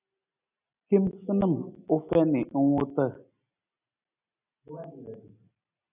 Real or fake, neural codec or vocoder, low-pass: real; none; 3.6 kHz